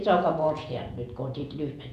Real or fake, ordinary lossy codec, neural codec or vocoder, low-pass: fake; none; vocoder, 48 kHz, 128 mel bands, Vocos; 14.4 kHz